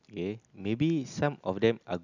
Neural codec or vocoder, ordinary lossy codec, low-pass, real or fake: none; none; 7.2 kHz; real